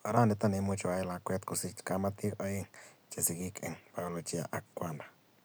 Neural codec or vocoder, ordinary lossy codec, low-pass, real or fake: none; none; none; real